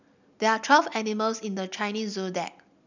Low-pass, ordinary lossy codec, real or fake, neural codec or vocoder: 7.2 kHz; none; real; none